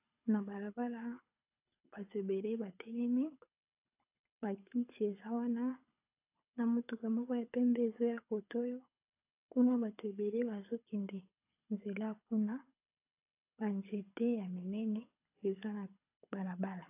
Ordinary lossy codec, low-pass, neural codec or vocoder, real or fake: AAC, 32 kbps; 3.6 kHz; codec, 24 kHz, 6 kbps, HILCodec; fake